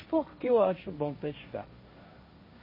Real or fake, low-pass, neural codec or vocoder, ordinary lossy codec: fake; 5.4 kHz; codec, 16 kHz, 1.1 kbps, Voila-Tokenizer; MP3, 24 kbps